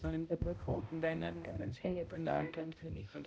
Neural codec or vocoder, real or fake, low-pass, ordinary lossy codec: codec, 16 kHz, 0.5 kbps, X-Codec, HuBERT features, trained on balanced general audio; fake; none; none